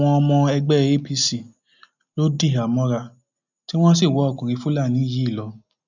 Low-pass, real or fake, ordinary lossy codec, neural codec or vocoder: 7.2 kHz; real; none; none